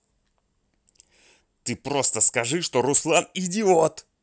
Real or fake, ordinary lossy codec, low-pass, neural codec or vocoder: real; none; none; none